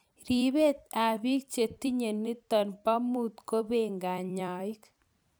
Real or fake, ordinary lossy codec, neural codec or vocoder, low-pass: fake; none; vocoder, 44.1 kHz, 128 mel bands every 256 samples, BigVGAN v2; none